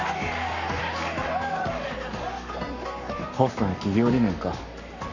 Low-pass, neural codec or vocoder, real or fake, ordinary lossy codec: 7.2 kHz; codec, 44.1 kHz, 7.8 kbps, Pupu-Codec; fake; none